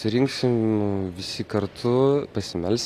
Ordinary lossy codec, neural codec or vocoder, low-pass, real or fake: AAC, 48 kbps; none; 14.4 kHz; real